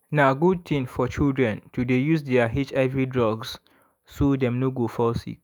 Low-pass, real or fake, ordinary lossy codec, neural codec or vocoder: none; fake; none; autoencoder, 48 kHz, 128 numbers a frame, DAC-VAE, trained on Japanese speech